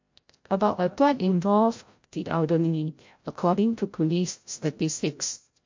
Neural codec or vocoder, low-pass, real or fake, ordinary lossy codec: codec, 16 kHz, 0.5 kbps, FreqCodec, larger model; 7.2 kHz; fake; MP3, 48 kbps